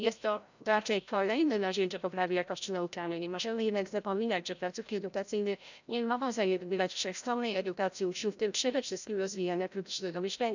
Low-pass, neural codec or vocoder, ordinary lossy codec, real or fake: 7.2 kHz; codec, 16 kHz, 0.5 kbps, FreqCodec, larger model; none; fake